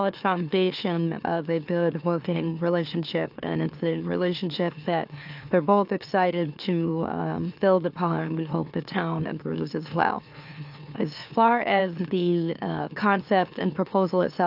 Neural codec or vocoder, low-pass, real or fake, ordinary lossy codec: autoencoder, 44.1 kHz, a latent of 192 numbers a frame, MeloTTS; 5.4 kHz; fake; AAC, 48 kbps